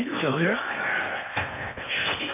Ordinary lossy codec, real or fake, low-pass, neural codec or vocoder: none; fake; 3.6 kHz; codec, 16 kHz in and 24 kHz out, 0.8 kbps, FocalCodec, streaming, 65536 codes